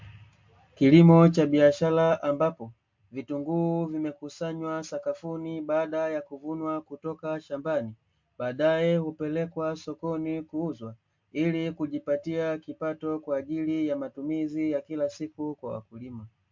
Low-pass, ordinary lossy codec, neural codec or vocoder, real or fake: 7.2 kHz; MP3, 48 kbps; none; real